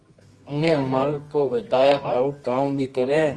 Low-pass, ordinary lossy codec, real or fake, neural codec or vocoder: 10.8 kHz; Opus, 32 kbps; fake; codec, 24 kHz, 0.9 kbps, WavTokenizer, medium music audio release